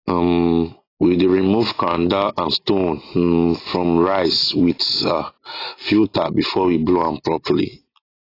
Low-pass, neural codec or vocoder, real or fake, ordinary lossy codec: 5.4 kHz; none; real; AAC, 24 kbps